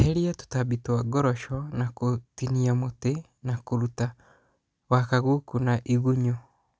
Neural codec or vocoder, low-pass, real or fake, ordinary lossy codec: none; none; real; none